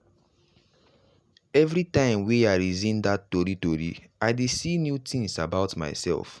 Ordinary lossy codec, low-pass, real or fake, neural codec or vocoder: none; none; real; none